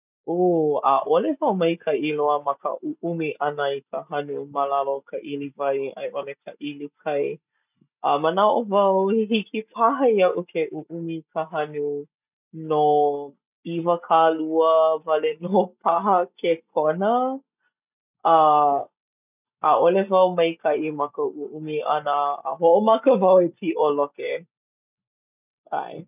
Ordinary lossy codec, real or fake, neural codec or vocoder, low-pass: none; real; none; 3.6 kHz